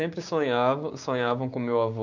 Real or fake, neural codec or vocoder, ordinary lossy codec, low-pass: fake; codec, 16 kHz, 6 kbps, DAC; none; 7.2 kHz